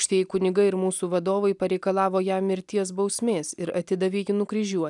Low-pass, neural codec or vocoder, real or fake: 10.8 kHz; none; real